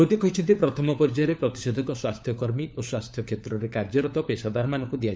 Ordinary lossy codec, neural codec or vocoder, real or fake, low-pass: none; codec, 16 kHz, 4 kbps, FunCodec, trained on LibriTTS, 50 frames a second; fake; none